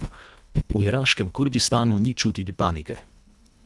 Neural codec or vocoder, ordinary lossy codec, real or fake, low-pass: codec, 24 kHz, 1.5 kbps, HILCodec; none; fake; none